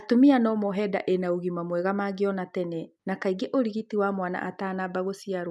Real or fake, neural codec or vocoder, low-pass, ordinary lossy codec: real; none; none; none